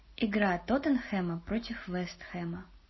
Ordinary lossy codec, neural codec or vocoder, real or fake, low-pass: MP3, 24 kbps; none; real; 7.2 kHz